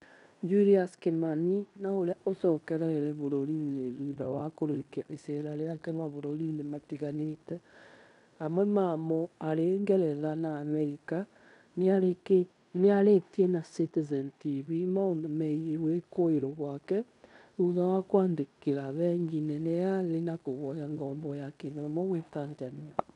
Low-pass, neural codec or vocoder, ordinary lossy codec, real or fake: 10.8 kHz; codec, 16 kHz in and 24 kHz out, 0.9 kbps, LongCat-Audio-Codec, fine tuned four codebook decoder; none; fake